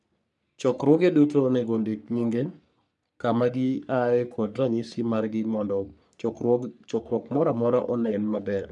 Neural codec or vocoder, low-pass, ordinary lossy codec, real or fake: codec, 44.1 kHz, 3.4 kbps, Pupu-Codec; 10.8 kHz; none; fake